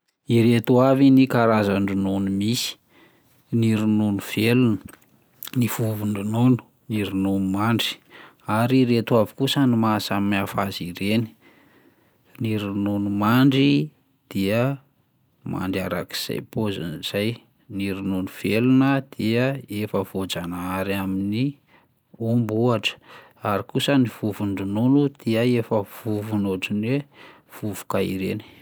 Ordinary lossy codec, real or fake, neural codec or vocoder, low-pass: none; real; none; none